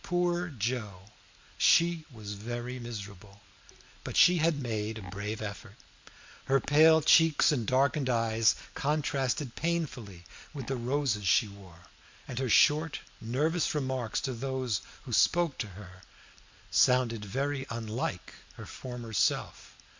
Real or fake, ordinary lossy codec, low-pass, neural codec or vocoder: real; MP3, 64 kbps; 7.2 kHz; none